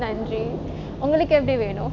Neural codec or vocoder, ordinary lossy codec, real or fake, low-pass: none; none; real; 7.2 kHz